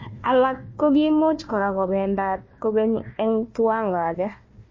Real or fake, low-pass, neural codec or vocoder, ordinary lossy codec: fake; 7.2 kHz; codec, 16 kHz, 1 kbps, FunCodec, trained on Chinese and English, 50 frames a second; MP3, 32 kbps